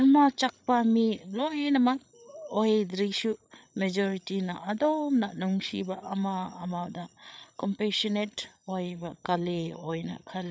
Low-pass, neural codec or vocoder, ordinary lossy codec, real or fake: none; codec, 16 kHz, 8 kbps, FreqCodec, larger model; none; fake